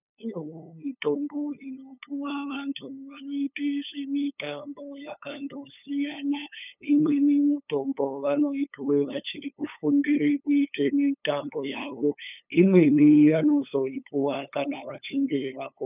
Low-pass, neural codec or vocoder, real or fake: 3.6 kHz; codec, 16 kHz, 8 kbps, FunCodec, trained on LibriTTS, 25 frames a second; fake